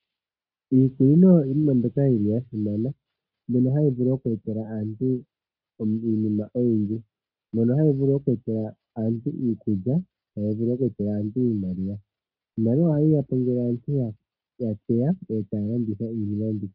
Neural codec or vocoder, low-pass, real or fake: none; 5.4 kHz; real